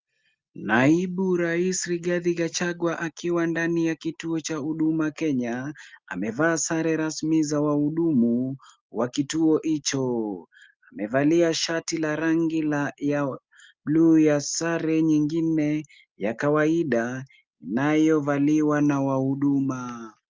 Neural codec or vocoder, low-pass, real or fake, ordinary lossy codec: none; 7.2 kHz; real; Opus, 32 kbps